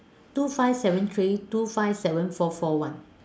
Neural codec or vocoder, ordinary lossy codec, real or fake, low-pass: none; none; real; none